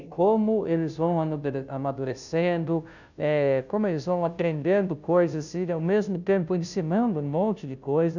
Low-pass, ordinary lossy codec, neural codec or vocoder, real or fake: 7.2 kHz; none; codec, 16 kHz, 0.5 kbps, FunCodec, trained on Chinese and English, 25 frames a second; fake